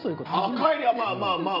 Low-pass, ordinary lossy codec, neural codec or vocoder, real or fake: 5.4 kHz; none; none; real